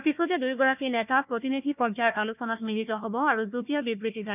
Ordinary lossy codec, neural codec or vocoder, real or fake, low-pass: none; codec, 16 kHz, 1 kbps, FunCodec, trained on Chinese and English, 50 frames a second; fake; 3.6 kHz